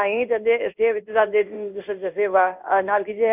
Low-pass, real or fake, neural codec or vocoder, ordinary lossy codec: 3.6 kHz; fake; codec, 16 kHz in and 24 kHz out, 1 kbps, XY-Tokenizer; none